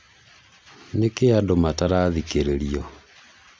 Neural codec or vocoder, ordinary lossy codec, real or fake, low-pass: none; none; real; none